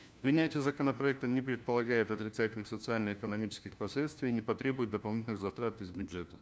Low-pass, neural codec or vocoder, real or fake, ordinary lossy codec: none; codec, 16 kHz, 1 kbps, FunCodec, trained on LibriTTS, 50 frames a second; fake; none